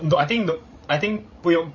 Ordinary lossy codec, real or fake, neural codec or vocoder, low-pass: MP3, 32 kbps; fake; codec, 16 kHz, 16 kbps, FreqCodec, larger model; 7.2 kHz